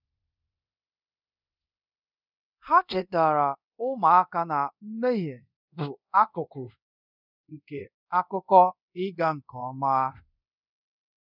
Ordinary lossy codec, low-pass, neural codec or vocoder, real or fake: none; 5.4 kHz; codec, 24 kHz, 0.5 kbps, DualCodec; fake